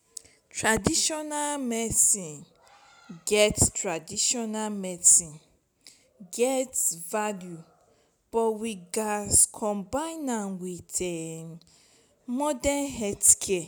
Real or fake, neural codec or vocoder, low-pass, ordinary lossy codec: real; none; none; none